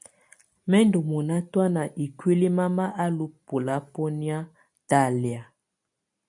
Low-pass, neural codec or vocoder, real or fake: 10.8 kHz; none; real